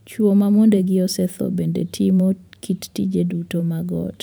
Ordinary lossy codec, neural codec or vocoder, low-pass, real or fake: none; vocoder, 44.1 kHz, 128 mel bands every 512 samples, BigVGAN v2; none; fake